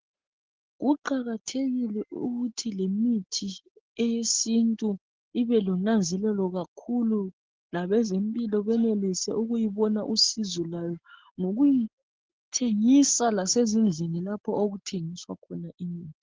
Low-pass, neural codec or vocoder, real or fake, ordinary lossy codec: 7.2 kHz; none; real; Opus, 16 kbps